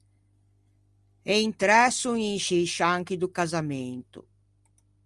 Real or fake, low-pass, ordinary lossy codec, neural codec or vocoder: real; 10.8 kHz; Opus, 32 kbps; none